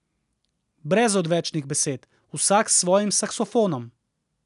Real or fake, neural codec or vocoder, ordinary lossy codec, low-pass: real; none; none; 10.8 kHz